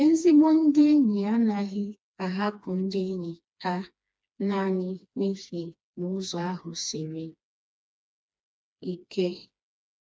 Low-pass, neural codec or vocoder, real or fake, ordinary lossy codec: none; codec, 16 kHz, 2 kbps, FreqCodec, smaller model; fake; none